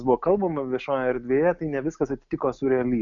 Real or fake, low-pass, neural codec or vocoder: real; 7.2 kHz; none